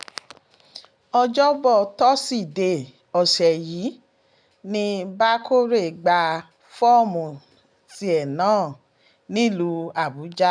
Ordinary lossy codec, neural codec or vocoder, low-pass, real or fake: none; none; 9.9 kHz; real